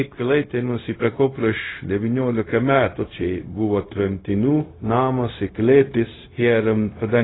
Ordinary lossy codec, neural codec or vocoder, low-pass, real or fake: AAC, 16 kbps; codec, 16 kHz, 0.4 kbps, LongCat-Audio-Codec; 7.2 kHz; fake